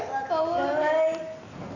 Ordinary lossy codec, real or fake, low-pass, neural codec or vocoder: none; real; 7.2 kHz; none